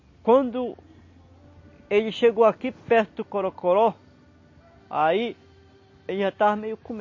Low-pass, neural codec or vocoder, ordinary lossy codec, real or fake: 7.2 kHz; none; MP3, 32 kbps; real